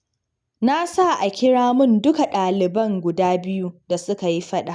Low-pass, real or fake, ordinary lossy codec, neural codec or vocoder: 9.9 kHz; real; none; none